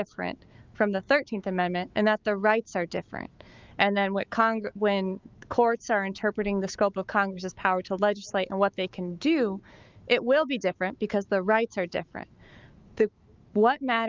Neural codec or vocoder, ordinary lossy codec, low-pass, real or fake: autoencoder, 48 kHz, 128 numbers a frame, DAC-VAE, trained on Japanese speech; Opus, 24 kbps; 7.2 kHz; fake